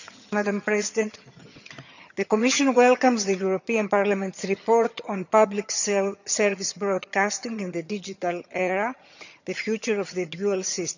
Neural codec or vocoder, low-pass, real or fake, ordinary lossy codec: vocoder, 22.05 kHz, 80 mel bands, HiFi-GAN; 7.2 kHz; fake; none